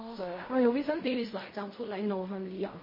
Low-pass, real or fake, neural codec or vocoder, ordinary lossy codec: 5.4 kHz; fake; codec, 16 kHz in and 24 kHz out, 0.4 kbps, LongCat-Audio-Codec, fine tuned four codebook decoder; MP3, 24 kbps